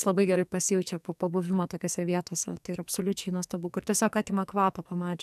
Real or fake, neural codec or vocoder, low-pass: fake; codec, 44.1 kHz, 2.6 kbps, SNAC; 14.4 kHz